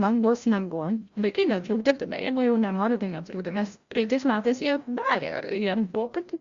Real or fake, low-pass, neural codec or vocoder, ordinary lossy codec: fake; 7.2 kHz; codec, 16 kHz, 0.5 kbps, FreqCodec, larger model; Opus, 64 kbps